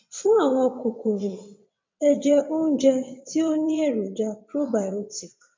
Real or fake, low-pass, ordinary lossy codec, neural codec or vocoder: fake; 7.2 kHz; MP3, 64 kbps; vocoder, 22.05 kHz, 80 mel bands, WaveNeXt